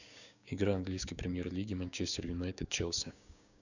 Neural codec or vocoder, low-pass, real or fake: codec, 16 kHz, 6 kbps, DAC; 7.2 kHz; fake